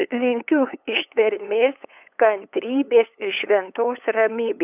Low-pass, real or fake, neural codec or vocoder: 3.6 kHz; fake; codec, 16 kHz, 8 kbps, FunCodec, trained on LibriTTS, 25 frames a second